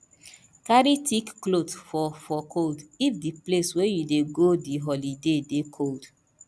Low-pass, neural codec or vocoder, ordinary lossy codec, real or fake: none; none; none; real